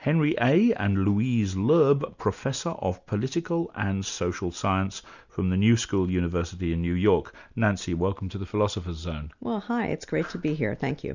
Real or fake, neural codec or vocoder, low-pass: real; none; 7.2 kHz